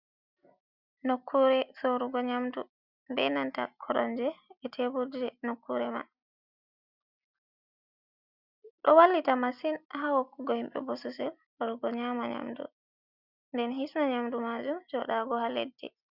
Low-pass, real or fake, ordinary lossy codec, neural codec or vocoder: 5.4 kHz; real; Opus, 64 kbps; none